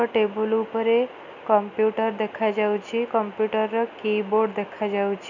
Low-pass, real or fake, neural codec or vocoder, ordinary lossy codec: 7.2 kHz; real; none; none